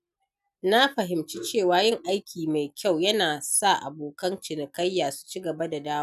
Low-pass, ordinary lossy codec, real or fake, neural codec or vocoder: 14.4 kHz; none; real; none